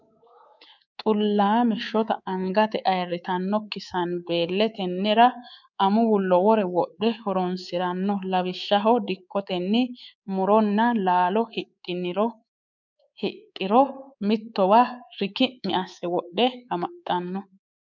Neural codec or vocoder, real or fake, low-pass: codec, 24 kHz, 3.1 kbps, DualCodec; fake; 7.2 kHz